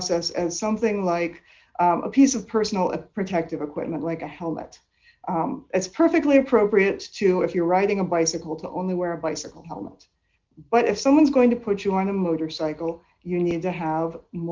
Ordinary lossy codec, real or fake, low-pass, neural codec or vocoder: Opus, 24 kbps; real; 7.2 kHz; none